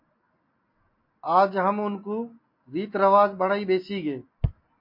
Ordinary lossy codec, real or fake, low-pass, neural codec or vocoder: MP3, 32 kbps; real; 5.4 kHz; none